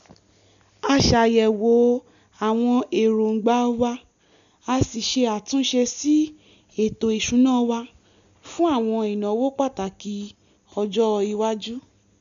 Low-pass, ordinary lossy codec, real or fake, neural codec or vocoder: 7.2 kHz; none; real; none